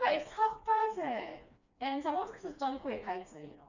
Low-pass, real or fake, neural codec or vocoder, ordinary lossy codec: 7.2 kHz; fake; codec, 16 kHz, 2 kbps, FreqCodec, smaller model; none